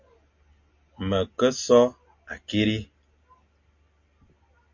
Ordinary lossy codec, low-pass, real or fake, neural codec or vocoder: MP3, 48 kbps; 7.2 kHz; real; none